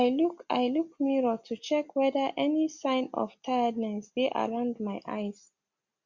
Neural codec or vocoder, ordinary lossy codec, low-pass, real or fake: none; Opus, 64 kbps; 7.2 kHz; real